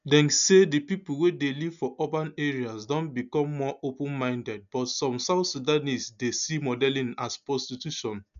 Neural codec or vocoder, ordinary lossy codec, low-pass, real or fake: none; none; 7.2 kHz; real